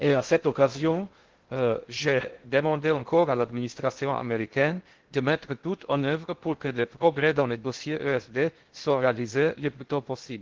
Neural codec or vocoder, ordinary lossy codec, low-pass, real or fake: codec, 16 kHz in and 24 kHz out, 0.6 kbps, FocalCodec, streaming, 2048 codes; Opus, 16 kbps; 7.2 kHz; fake